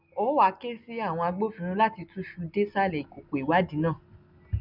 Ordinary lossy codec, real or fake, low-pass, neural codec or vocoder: none; real; 5.4 kHz; none